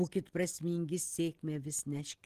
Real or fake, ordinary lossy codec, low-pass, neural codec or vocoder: real; Opus, 16 kbps; 14.4 kHz; none